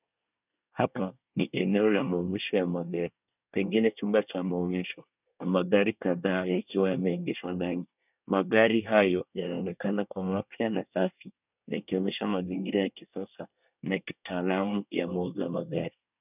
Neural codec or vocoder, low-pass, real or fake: codec, 24 kHz, 1 kbps, SNAC; 3.6 kHz; fake